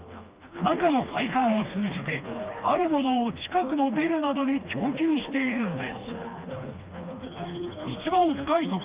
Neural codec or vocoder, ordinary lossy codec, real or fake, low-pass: codec, 16 kHz, 2 kbps, FreqCodec, smaller model; Opus, 32 kbps; fake; 3.6 kHz